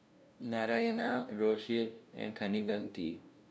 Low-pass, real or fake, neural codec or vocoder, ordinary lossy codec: none; fake; codec, 16 kHz, 0.5 kbps, FunCodec, trained on LibriTTS, 25 frames a second; none